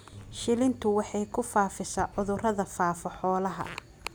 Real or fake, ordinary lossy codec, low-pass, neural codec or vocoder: real; none; none; none